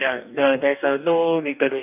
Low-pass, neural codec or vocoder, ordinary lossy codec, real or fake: 3.6 kHz; codec, 44.1 kHz, 2.6 kbps, DAC; none; fake